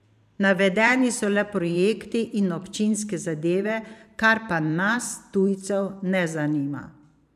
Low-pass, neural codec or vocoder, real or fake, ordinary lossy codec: 14.4 kHz; vocoder, 44.1 kHz, 128 mel bands every 512 samples, BigVGAN v2; fake; none